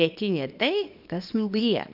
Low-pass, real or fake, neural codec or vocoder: 5.4 kHz; fake; codec, 24 kHz, 0.9 kbps, WavTokenizer, small release